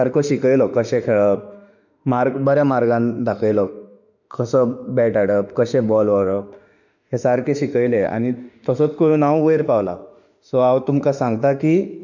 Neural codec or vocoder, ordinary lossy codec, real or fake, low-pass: autoencoder, 48 kHz, 32 numbers a frame, DAC-VAE, trained on Japanese speech; none; fake; 7.2 kHz